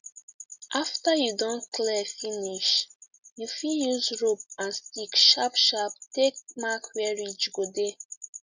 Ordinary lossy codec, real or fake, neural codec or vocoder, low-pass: none; real; none; 7.2 kHz